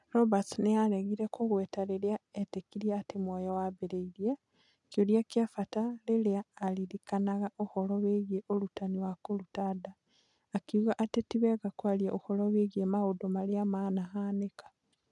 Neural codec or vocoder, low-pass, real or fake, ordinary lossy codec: none; 10.8 kHz; real; none